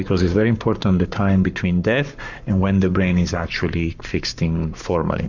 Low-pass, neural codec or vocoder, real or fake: 7.2 kHz; codec, 44.1 kHz, 7.8 kbps, Pupu-Codec; fake